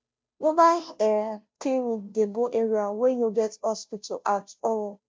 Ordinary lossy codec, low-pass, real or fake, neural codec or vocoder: none; none; fake; codec, 16 kHz, 0.5 kbps, FunCodec, trained on Chinese and English, 25 frames a second